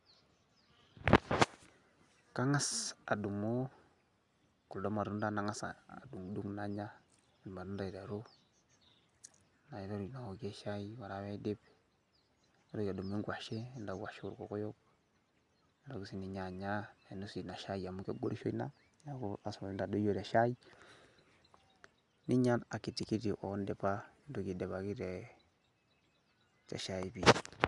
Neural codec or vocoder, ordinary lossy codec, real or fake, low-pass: none; Opus, 64 kbps; real; 10.8 kHz